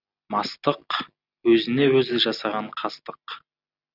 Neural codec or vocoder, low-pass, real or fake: none; 5.4 kHz; real